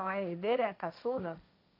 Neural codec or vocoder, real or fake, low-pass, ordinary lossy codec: codec, 16 kHz, 1.1 kbps, Voila-Tokenizer; fake; 5.4 kHz; AAC, 48 kbps